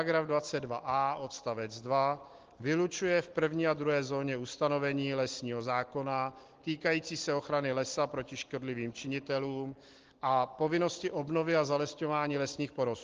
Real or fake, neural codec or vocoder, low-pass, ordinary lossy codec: real; none; 7.2 kHz; Opus, 16 kbps